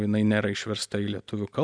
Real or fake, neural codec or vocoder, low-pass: real; none; 9.9 kHz